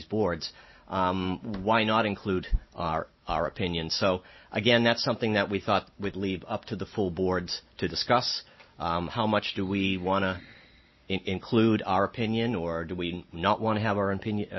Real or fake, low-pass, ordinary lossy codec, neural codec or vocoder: real; 7.2 kHz; MP3, 24 kbps; none